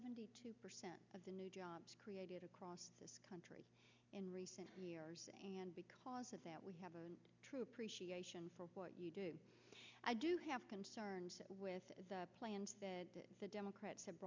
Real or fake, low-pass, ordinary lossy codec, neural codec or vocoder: real; 7.2 kHz; MP3, 64 kbps; none